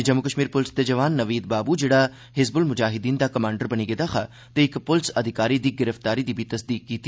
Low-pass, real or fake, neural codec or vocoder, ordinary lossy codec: none; real; none; none